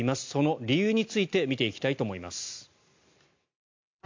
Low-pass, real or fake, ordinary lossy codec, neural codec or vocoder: 7.2 kHz; real; MP3, 64 kbps; none